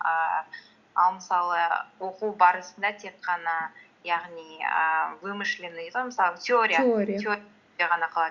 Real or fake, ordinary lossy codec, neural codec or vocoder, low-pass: real; Opus, 64 kbps; none; 7.2 kHz